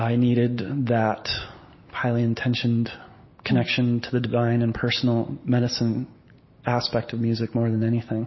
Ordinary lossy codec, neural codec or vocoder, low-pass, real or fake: MP3, 24 kbps; none; 7.2 kHz; real